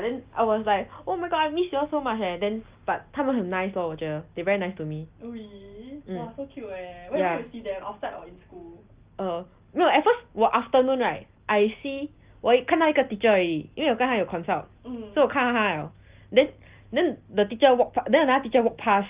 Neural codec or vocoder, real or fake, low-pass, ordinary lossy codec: none; real; 3.6 kHz; Opus, 32 kbps